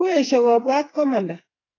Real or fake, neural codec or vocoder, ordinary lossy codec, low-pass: fake; codec, 44.1 kHz, 2.6 kbps, SNAC; AAC, 32 kbps; 7.2 kHz